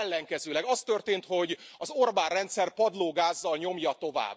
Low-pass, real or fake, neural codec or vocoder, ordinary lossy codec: none; real; none; none